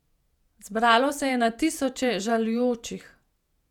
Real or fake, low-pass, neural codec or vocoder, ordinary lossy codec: fake; 19.8 kHz; vocoder, 48 kHz, 128 mel bands, Vocos; none